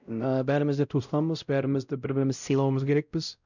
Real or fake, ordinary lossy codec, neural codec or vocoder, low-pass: fake; none; codec, 16 kHz, 0.5 kbps, X-Codec, WavLM features, trained on Multilingual LibriSpeech; 7.2 kHz